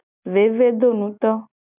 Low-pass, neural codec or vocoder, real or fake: 3.6 kHz; none; real